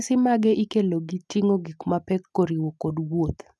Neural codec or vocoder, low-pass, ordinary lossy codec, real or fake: none; none; none; real